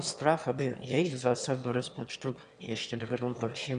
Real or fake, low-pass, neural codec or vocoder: fake; 9.9 kHz; autoencoder, 22.05 kHz, a latent of 192 numbers a frame, VITS, trained on one speaker